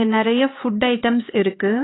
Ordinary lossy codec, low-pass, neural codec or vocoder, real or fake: AAC, 16 kbps; 7.2 kHz; codec, 16 kHz, 4 kbps, X-Codec, HuBERT features, trained on LibriSpeech; fake